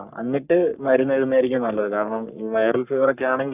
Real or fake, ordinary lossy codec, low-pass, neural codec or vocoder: fake; none; 3.6 kHz; codec, 44.1 kHz, 3.4 kbps, Pupu-Codec